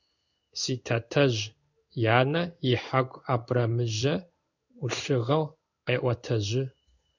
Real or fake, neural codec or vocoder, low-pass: fake; codec, 16 kHz in and 24 kHz out, 1 kbps, XY-Tokenizer; 7.2 kHz